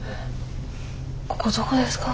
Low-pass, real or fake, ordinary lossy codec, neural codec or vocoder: none; real; none; none